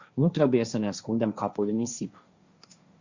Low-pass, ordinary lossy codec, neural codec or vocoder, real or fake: 7.2 kHz; Opus, 64 kbps; codec, 16 kHz, 1.1 kbps, Voila-Tokenizer; fake